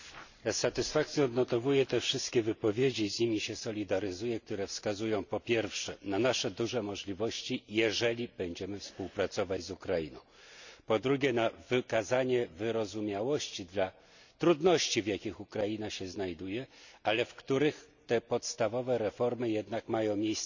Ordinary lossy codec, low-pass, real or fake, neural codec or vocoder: none; 7.2 kHz; real; none